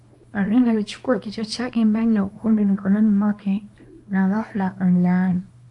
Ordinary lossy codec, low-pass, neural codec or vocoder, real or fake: AAC, 64 kbps; 10.8 kHz; codec, 24 kHz, 0.9 kbps, WavTokenizer, small release; fake